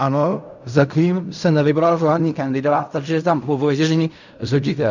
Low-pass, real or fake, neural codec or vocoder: 7.2 kHz; fake; codec, 16 kHz in and 24 kHz out, 0.4 kbps, LongCat-Audio-Codec, fine tuned four codebook decoder